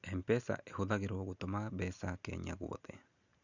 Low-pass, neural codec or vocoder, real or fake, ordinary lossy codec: 7.2 kHz; none; real; none